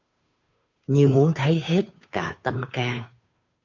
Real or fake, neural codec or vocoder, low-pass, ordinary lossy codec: fake; codec, 16 kHz, 2 kbps, FunCodec, trained on Chinese and English, 25 frames a second; 7.2 kHz; MP3, 64 kbps